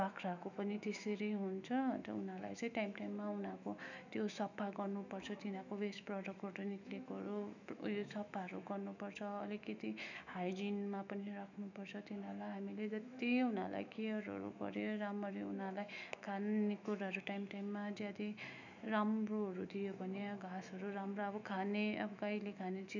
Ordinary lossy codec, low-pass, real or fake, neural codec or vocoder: none; 7.2 kHz; fake; autoencoder, 48 kHz, 128 numbers a frame, DAC-VAE, trained on Japanese speech